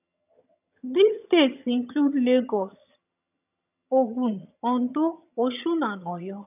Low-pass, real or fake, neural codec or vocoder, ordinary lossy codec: 3.6 kHz; fake; vocoder, 22.05 kHz, 80 mel bands, HiFi-GAN; none